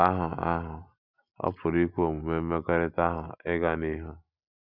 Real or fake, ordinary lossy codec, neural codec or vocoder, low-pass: real; Opus, 64 kbps; none; 5.4 kHz